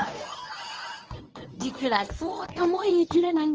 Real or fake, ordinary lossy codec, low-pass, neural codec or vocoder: fake; Opus, 24 kbps; 7.2 kHz; codec, 24 kHz, 0.9 kbps, WavTokenizer, medium speech release version 1